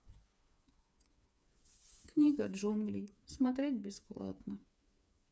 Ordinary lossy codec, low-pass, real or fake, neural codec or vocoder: none; none; fake; codec, 16 kHz, 4 kbps, FreqCodec, smaller model